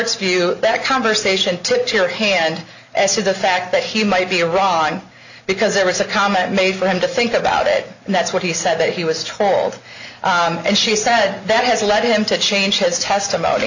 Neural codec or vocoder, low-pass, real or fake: none; 7.2 kHz; real